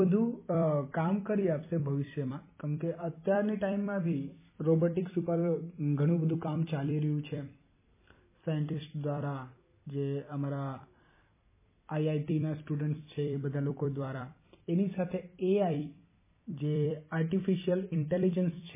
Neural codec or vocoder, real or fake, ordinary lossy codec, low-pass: vocoder, 44.1 kHz, 128 mel bands every 256 samples, BigVGAN v2; fake; MP3, 16 kbps; 3.6 kHz